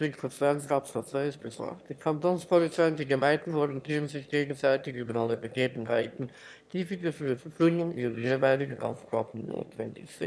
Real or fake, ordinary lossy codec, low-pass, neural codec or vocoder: fake; none; none; autoencoder, 22.05 kHz, a latent of 192 numbers a frame, VITS, trained on one speaker